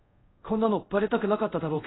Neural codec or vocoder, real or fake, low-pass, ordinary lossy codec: codec, 24 kHz, 0.5 kbps, DualCodec; fake; 7.2 kHz; AAC, 16 kbps